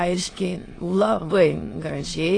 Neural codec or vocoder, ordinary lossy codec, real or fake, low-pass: autoencoder, 22.05 kHz, a latent of 192 numbers a frame, VITS, trained on many speakers; AAC, 32 kbps; fake; 9.9 kHz